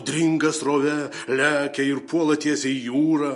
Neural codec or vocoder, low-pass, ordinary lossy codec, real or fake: none; 14.4 kHz; MP3, 48 kbps; real